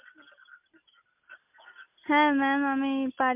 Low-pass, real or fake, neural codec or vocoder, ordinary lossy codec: 3.6 kHz; real; none; MP3, 32 kbps